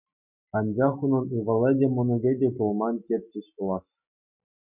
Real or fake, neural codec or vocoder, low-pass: real; none; 3.6 kHz